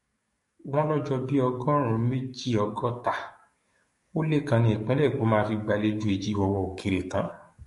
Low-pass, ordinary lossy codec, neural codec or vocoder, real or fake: 14.4 kHz; MP3, 48 kbps; codec, 44.1 kHz, 7.8 kbps, DAC; fake